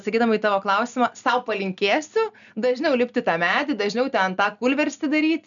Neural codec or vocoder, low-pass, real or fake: none; 7.2 kHz; real